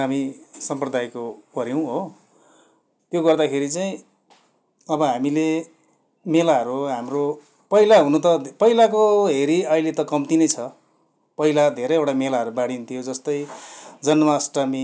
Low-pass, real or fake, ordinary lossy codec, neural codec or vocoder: none; real; none; none